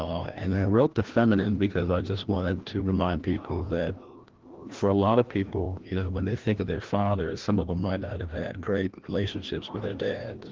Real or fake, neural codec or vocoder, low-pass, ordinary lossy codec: fake; codec, 16 kHz, 1 kbps, FreqCodec, larger model; 7.2 kHz; Opus, 16 kbps